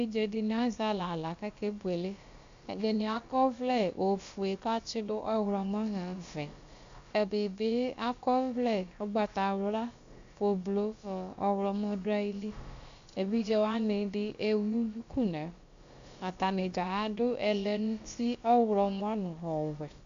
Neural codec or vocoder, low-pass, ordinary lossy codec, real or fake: codec, 16 kHz, about 1 kbps, DyCAST, with the encoder's durations; 7.2 kHz; MP3, 64 kbps; fake